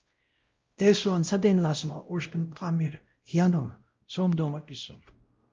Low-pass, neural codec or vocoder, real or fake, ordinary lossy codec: 7.2 kHz; codec, 16 kHz, 0.5 kbps, X-Codec, WavLM features, trained on Multilingual LibriSpeech; fake; Opus, 24 kbps